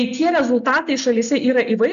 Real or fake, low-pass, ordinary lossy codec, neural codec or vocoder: real; 7.2 kHz; AAC, 96 kbps; none